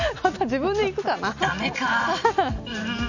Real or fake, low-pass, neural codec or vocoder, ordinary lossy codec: real; 7.2 kHz; none; none